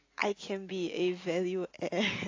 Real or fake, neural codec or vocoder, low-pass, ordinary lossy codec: real; none; 7.2 kHz; AAC, 32 kbps